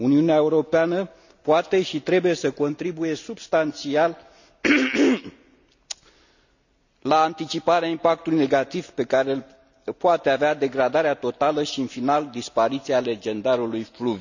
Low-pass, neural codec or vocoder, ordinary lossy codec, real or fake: 7.2 kHz; none; none; real